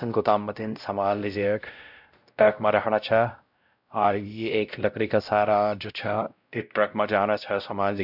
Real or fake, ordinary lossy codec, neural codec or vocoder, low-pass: fake; none; codec, 16 kHz, 0.5 kbps, X-Codec, WavLM features, trained on Multilingual LibriSpeech; 5.4 kHz